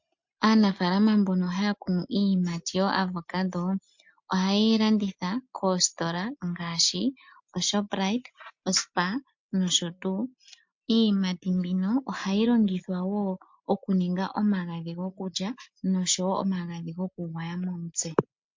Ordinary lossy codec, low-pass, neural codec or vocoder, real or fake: MP3, 48 kbps; 7.2 kHz; none; real